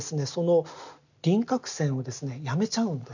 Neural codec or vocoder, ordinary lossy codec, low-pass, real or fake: none; none; 7.2 kHz; real